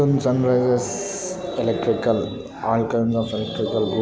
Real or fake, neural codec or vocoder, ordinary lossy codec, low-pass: real; none; none; none